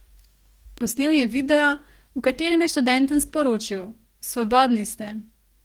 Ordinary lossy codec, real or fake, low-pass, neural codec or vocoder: Opus, 24 kbps; fake; 19.8 kHz; codec, 44.1 kHz, 2.6 kbps, DAC